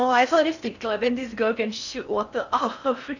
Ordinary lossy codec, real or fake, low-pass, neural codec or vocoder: none; fake; 7.2 kHz; codec, 16 kHz in and 24 kHz out, 0.6 kbps, FocalCodec, streaming, 4096 codes